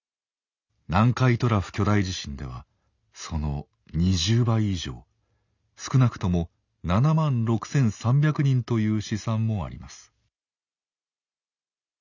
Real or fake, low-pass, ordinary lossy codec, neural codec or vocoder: real; 7.2 kHz; none; none